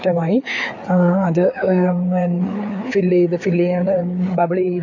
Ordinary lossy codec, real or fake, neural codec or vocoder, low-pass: AAC, 48 kbps; fake; codec, 16 kHz, 4 kbps, FreqCodec, larger model; 7.2 kHz